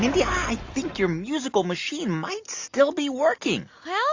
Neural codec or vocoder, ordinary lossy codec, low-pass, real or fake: none; AAC, 48 kbps; 7.2 kHz; real